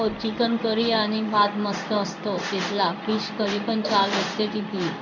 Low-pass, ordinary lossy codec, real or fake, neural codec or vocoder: 7.2 kHz; none; fake; codec, 16 kHz in and 24 kHz out, 1 kbps, XY-Tokenizer